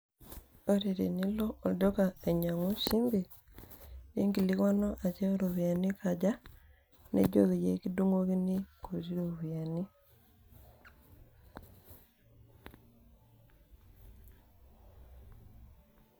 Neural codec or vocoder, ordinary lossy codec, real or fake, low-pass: none; none; real; none